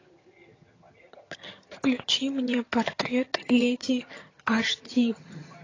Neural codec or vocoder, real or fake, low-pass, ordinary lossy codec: vocoder, 22.05 kHz, 80 mel bands, HiFi-GAN; fake; 7.2 kHz; AAC, 32 kbps